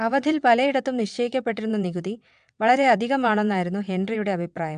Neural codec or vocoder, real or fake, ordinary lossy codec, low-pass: vocoder, 22.05 kHz, 80 mel bands, WaveNeXt; fake; AAC, 96 kbps; 9.9 kHz